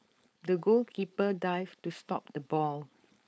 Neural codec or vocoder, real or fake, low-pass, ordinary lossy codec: codec, 16 kHz, 4.8 kbps, FACodec; fake; none; none